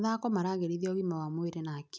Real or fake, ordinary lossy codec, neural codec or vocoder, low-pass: real; none; none; none